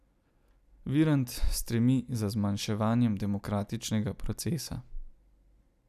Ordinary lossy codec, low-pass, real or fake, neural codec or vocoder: none; 14.4 kHz; real; none